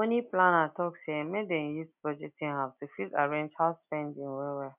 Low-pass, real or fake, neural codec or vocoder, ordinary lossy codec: 3.6 kHz; real; none; none